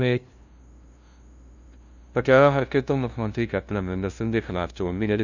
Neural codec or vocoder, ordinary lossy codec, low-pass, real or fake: codec, 16 kHz, 0.5 kbps, FunCodec, trained on LibriTTS, 25 frames a second; none; 7.2 kHz; fake